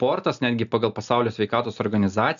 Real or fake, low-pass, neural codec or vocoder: real; 7.2 kHz; none